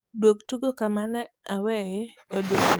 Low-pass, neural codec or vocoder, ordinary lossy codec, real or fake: none; codec, 44.1 kHz, 7.8 kbps, DAC; none; fake